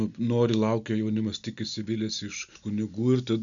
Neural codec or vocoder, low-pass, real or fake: none; 7.2 kHz; real